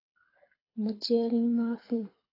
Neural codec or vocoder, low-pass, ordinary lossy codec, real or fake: codec, 24 kHz, 6 kbps, HILCodec; 5.4 kHz; AAC, 24 kbps; fake